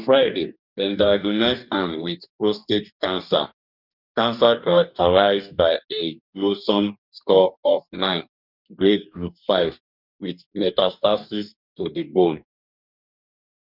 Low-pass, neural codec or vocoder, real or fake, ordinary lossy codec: 5.4 kHz; codec, 44.1 kHz, 2.6 kbps, DAC; fake; none